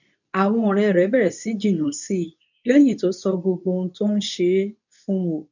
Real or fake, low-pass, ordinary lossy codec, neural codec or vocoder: fake; 7.2 kHz; none; codec, 24 kHz, 0.9 kbps, WavTokenizer, medium speech release version 2